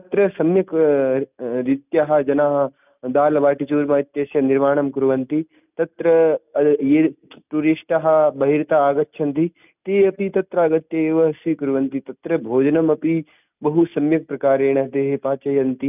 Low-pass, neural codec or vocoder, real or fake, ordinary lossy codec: 3.6 kHz; none; real; none